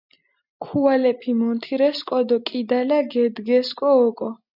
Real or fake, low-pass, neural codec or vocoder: real; 5.4 kHz; none